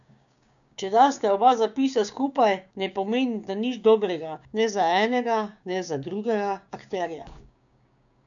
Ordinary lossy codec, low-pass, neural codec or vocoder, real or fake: none; 7.2 kHz; codec, 16 kHz, 6 kbps, DAC; fake